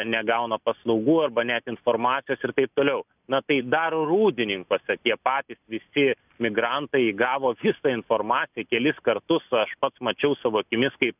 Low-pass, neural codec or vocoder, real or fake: 3.6 kHz; none; real